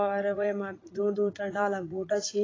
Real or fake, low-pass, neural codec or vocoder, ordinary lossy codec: fake; 7.2 kHz; vocoder, 44.1 kHz, 80 mel bands, Vocos; AAC, 32 kbps